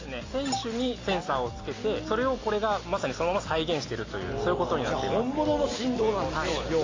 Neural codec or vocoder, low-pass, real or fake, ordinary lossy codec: none; 7.2 kHz; real; AAC, 32 kbps